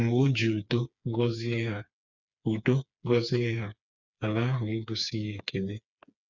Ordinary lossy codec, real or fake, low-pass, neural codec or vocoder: none; fake; 7.2 kHz; codec, 16 kHz, 4 kbps, FreqCodec, smaller model